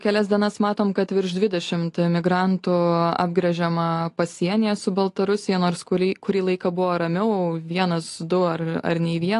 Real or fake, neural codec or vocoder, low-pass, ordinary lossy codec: real; none; 10.8 kHz; AAC, 48 kbps